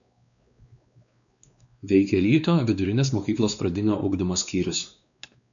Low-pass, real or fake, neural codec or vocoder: 7.2 kHz; fake; codec, 16 kHz, 2 kbps, X-Codec, WavLM features, trained on Multilingual LibriSpeech